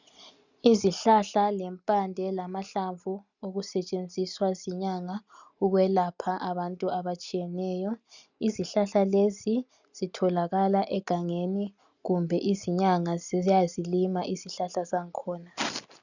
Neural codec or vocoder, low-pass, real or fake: none; 7.2 kHz; real